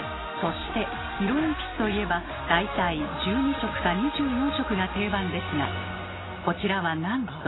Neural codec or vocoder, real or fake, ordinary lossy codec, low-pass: none; real; AAC, 16 kbps; 7.2 kHz